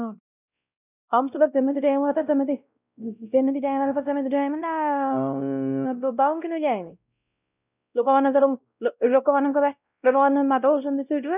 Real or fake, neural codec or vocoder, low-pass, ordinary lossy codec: fake; codec, 16 kHz, 0.5 kbps, X-Codec, WavLM features, trained on Multilingual LibriSpeech; 3.6 kHz; none